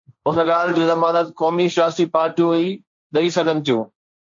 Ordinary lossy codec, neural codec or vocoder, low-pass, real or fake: MP3, 48 kbps; codec, 16 kHz, 1.1 kbps, Voila-Tokenizer; 7.2 kHz; fake